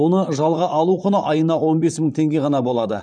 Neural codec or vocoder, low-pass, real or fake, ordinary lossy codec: vocoder, 44.1 kHz, 128 mel bands, Pupu-Vocoder; 9.9 kHz; fake; none